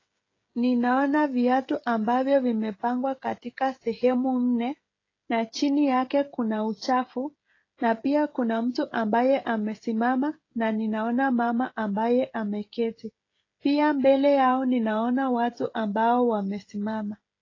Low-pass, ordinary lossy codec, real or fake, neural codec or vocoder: 7.2 kHz; AAC, 32 kbps; fake; codec, 16 kHz, 16 kbps, FreqCodec, smaller model